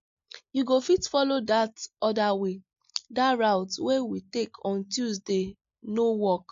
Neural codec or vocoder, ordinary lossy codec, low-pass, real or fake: none; MP3, 48 kbps; 7.2 kHz; real